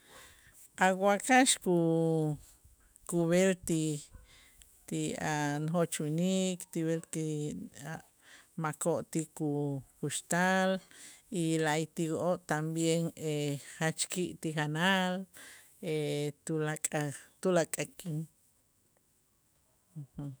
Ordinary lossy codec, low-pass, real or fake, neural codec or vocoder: none; none; fake; autoencoder, 48 kHz, 128 numbers a frame, DAC-VAE, trained on Japanese speech